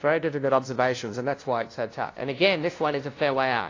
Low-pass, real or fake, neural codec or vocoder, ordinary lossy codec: 7.2 kHz; fake; codec, 16 kHz, 0.5 kbps, FunCodec, trained on LibriTTS, 25 frames a second; AAC, 32 kbps